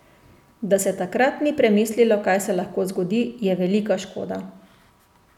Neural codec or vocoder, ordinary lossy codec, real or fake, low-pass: none; none; real; 19.8 kHz